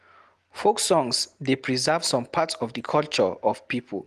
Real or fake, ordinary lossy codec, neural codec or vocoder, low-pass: real; Opus, 24 kbps; none; 14.4 kHz